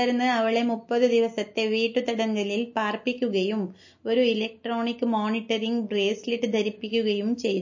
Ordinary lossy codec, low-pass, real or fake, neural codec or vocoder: MP3, 32 kbps; 7.2 kHz; real; none